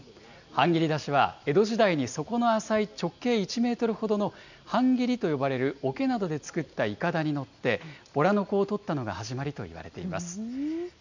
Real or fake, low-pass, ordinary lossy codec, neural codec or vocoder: real; 7.2 kHz; none; none